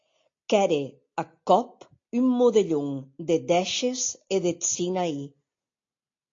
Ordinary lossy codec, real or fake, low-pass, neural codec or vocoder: AAC, 48 kbps; real; 7.2 kHz; none